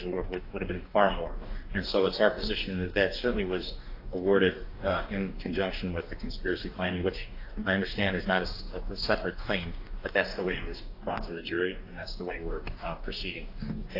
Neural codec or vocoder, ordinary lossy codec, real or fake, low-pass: codec, 44.1 kHz, 2.6 kbps, DAC; AAC, 32 kbps; fake; 5.4 kHz